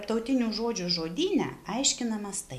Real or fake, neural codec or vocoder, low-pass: real; none; 14.4 kHz